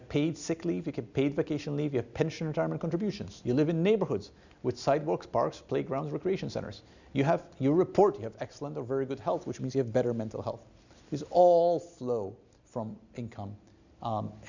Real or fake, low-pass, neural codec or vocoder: real; 7.2 kHz; none